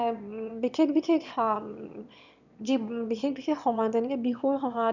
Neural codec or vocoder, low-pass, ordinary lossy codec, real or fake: autoencoder, 22.05 kHz, a latent of 192 numbers a frame, VITS, trained on one speaker; 7.2 kHz; none; fake